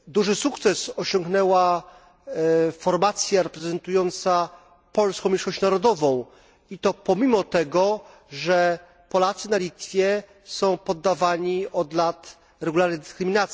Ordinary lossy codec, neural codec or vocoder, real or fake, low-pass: none; none; real; none